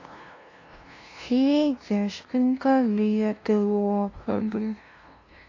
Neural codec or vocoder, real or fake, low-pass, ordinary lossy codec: codec, 16 kHz, 0.5 kbps, FunCodec, trained on LibriTTS, 25 frames a second; fake; 7.2 kHz; AAC, 32 kbps